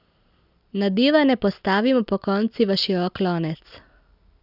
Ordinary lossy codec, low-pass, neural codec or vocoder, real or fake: none; 5.4 kHz; none; real